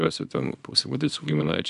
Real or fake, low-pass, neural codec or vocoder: fake; 10.8 kHz; codec, 24 kHz, 0.9 kbps, WavTokenizer, small release